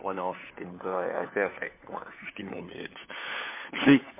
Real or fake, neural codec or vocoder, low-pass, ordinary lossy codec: fake; codec, 16 kHz, 4 kbps, FunCodec, trained on LibriTTS, 50 frames a second; 3.6 kHz; MP3, 24 kbps